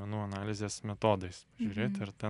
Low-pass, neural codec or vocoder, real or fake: 10.8 kHz; none; real